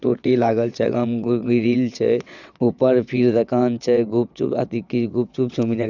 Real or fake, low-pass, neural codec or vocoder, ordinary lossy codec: fake; 7.2 kHz; vocoder, 22.05 kHz, 80 mel bands, WaveNeXt; none